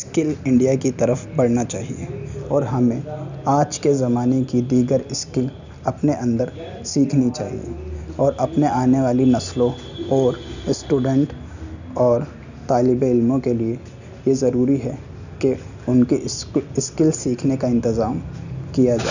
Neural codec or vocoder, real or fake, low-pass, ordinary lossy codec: none; real; 7.2 kHz; none